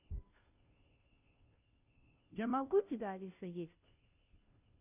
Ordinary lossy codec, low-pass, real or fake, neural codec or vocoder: none; 3.6 kHz; fake; codec, 16 kHz, 0.5 kbps, FunCodec, trained on Chinese and English, 25 frames a second